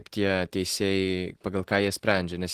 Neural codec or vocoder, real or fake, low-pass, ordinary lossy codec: none; real; 14.4 kHz; Opus, 16 kbps